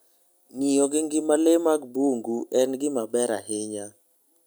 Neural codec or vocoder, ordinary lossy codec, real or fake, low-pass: none; none; real; none